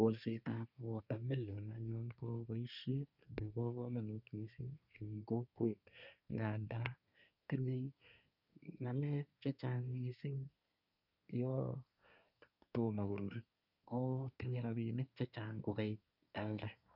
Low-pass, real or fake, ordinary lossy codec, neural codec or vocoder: 5.4 kHz; fake; none; codec, 24 kHz, 1 kbps, SNAC